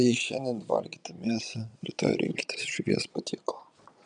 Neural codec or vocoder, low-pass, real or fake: vocoder, 22.05 kHz, 80 mel bands, Vocos; 9.9 kHz; fake